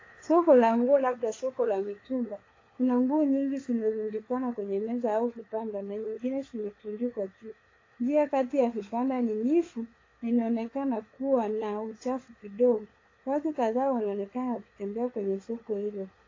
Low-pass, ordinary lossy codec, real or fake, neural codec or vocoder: 7.2 kHz; AAC, 32 kbps; fake; codec, 16 kHz, 4 kbps, FunCodec, trained on LibriTTS, 50 frames a second